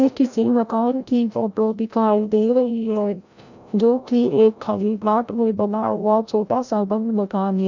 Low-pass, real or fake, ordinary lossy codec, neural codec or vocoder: 7.2 kHz; fake; none; codec, 16 kHz, 0.5 kbps, FreqCodec, larger model